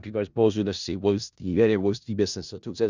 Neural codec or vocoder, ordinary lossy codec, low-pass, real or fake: codec, 16 kHz in and 24 kHz out, 0.4 kbps, LongCat-Audio-Codec, four codebook decoder; Opus, 64 kbps; 7.2 kHz; fake